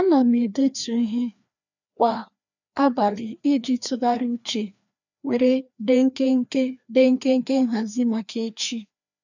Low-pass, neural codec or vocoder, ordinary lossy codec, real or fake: 7.2 kHz; codec, 16 kHz, 2 kbps, FreqCodec, larger model; none; fake